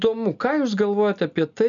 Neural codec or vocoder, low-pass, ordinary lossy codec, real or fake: none; 7.2 kHz; MP3, 96 kbps; real